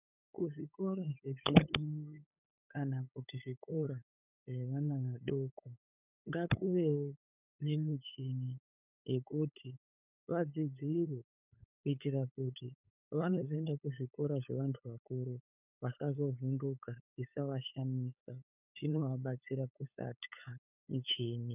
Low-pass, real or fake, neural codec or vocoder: 3.6 kHz; fake; codec, 16 kHz, 8 kbps, FunCodec, trained on LibriTTS, 25 frames a second